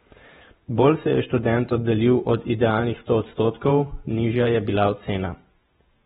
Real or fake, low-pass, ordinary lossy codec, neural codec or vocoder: fake; 19.8 kHz; AAC, 16 kbps; vocoder, 48 kHz, 128 mel bands, Vocos